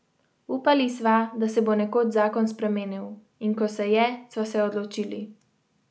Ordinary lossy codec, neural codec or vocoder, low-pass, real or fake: none; none; none; real